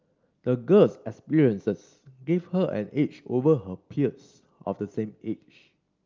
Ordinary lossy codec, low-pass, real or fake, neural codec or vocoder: Opus, 24 kbps; 7.2 kHz; real; none